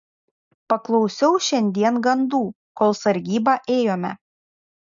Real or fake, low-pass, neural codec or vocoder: real; 7.2 kHz; none